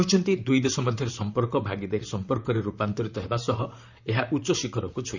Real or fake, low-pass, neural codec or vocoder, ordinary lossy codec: fake; 7.2 kHz; vocoder, 44.1 kHz, 128 mel bands, Pupu-Vocoder; none